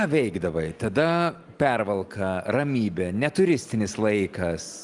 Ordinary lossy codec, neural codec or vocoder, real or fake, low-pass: Opus, 24 kbps; none; real; 10.8 kHz